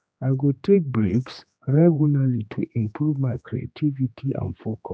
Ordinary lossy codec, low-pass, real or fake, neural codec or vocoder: none; none; fake; codec, 16 kHz, 2 kbps, X-Codec, HuBERT features, trained on general audio